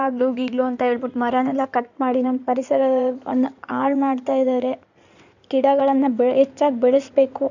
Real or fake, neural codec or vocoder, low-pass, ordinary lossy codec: fake; codec, 16 kHz in and 24 kHz out, 2.2 kbps, FireRedTTS-2 codec; 7.2 kHz; none